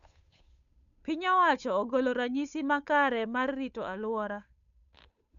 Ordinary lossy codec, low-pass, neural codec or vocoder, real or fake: MP3, 96 kbps; 7.2 kHz; codec, 16 kHz, 8 kbps, FunCodec, trained on Chinese and English, 25 frames a second; fake